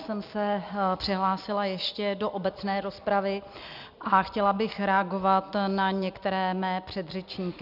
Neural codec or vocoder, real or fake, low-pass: none; real; 5.4 kHz